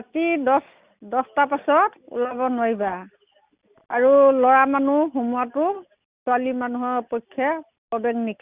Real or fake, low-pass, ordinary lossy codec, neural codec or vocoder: real; 3.6 kHz; Opus, 64 kbps; none